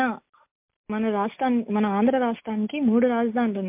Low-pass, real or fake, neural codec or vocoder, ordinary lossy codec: 3.6 kHz; real; none; MP3, 32 kbps